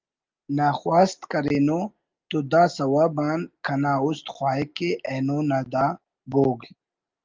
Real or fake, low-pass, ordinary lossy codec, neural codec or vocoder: real; 7.2 kHz; Opus, 32 kbps; none